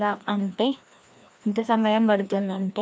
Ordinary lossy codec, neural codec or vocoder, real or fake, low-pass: none; codec, 16 kHz, 1 kbps, FreqCodec, larger model; fake; none